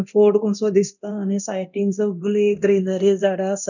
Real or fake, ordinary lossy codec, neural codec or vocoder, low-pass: fake; none; codec, 24 kHz, 0.9 kbps, DualCodec; 7.2 kHz